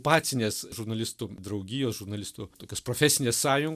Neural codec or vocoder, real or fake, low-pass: none; real; 14.4 kHz